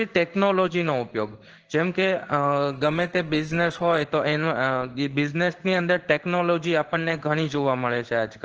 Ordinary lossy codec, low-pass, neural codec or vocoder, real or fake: Opus, 16 kbps; 7.2 kHz; codec, 16 kHz in and 24 kHz out, 1 kbps, XY-Tokenizer; fake